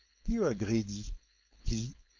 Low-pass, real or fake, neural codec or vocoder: 7.2 kHz; fake; codec, 16 kHz, 4.8 kbps, FACodec